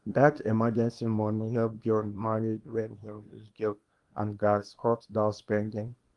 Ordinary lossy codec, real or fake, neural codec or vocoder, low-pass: Opus, 24 kbps; fake; codec, 24 kHz, 0.9 kbps, WavTokenizer, small release; 10.8 kHz